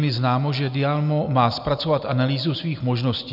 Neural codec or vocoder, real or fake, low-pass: none; real; 5.4 kHz